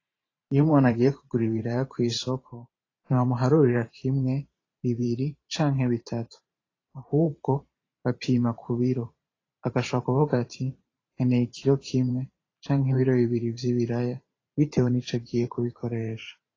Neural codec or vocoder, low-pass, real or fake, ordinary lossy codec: vocoder, 44.1 kHz, 128 mel bands every 512 samples, BigVGAN v2; 7.2 kHz; fake; AAC, 32 kbps